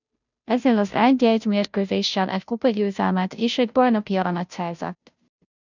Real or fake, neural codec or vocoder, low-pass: fake; codec, 16 kHz, 0.5 kbps, FunCodec, trained on Chinese and English, 25 frames a second; 7.2 kHz